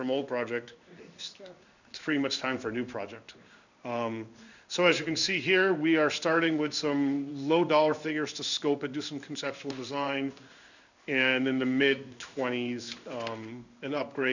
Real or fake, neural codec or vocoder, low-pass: fake; codec, 16 kHz in and 24 kHz out, 1 kbps, XY-Tokenizer; 7.2 kHz